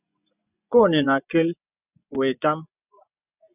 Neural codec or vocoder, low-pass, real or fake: vocoder, 24 kHz, 100 mel bands, Vocos; 3.6 kHz; fake